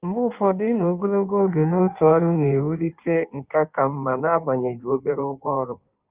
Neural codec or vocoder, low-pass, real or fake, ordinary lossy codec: codec, 16 kHz in and 24 kHz out, 1.1 kbps, FireRedTTS-2 codec; 3.6 kHz; fake; Opus, 24 kbps